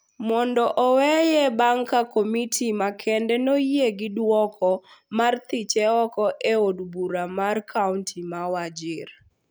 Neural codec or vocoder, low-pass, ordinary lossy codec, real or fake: none; none; none; real